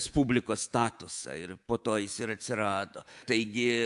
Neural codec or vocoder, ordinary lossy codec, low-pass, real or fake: codec, 24 kHz, 3.1 kbps, DualCodec; AAC, 64 kbps; 10.8 kHz; fake